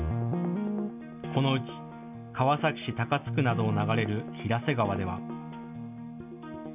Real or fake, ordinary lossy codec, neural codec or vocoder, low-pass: real; none; none; 3.6 kHz